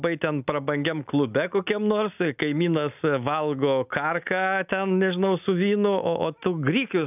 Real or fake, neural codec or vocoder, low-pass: real; none; 3.6 kHz